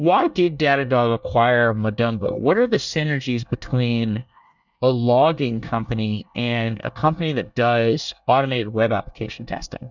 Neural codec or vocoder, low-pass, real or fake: codec, 24 kHz, 1 kbps, SNAC; 7.2 kHz; fake